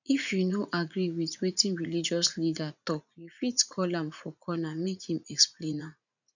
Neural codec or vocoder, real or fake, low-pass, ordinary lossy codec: vocoder, 44.1 kHz, 80 mel bands, Vocos; fake; 7.2 kHz; none